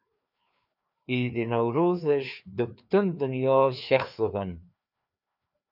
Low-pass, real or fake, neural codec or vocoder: 5.4 kHz; fake; codec, 16 kHz, 2 kbps, FreqCodec, larger model